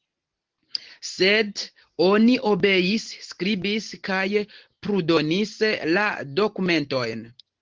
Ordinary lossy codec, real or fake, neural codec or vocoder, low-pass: Opus, 16 kbps; real; none; 7.2 kHz